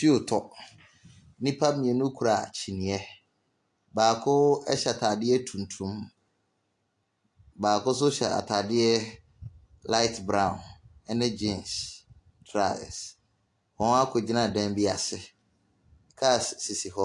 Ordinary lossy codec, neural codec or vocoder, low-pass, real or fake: AAC, 64 kbps; none; 10.8 kHz; real